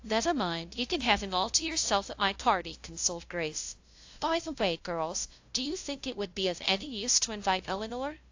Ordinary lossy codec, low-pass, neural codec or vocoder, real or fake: AAC, 48 kbps; 7.2 kHz; codec, 16 kHz, 0.5 kbps, FunCodec, trained on LibriTTS, 25 frames a second; fake